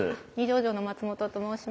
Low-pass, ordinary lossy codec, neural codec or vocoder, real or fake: none; none; none; real